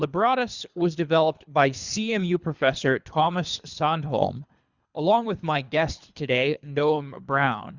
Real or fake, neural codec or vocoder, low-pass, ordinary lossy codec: fake; codec, 24 kHz, 3 kbps, HILCodec; 7.2 kHz; Opus, 64 kbps